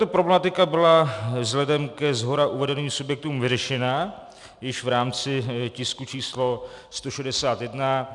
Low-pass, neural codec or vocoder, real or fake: 10.8 kHz; none; real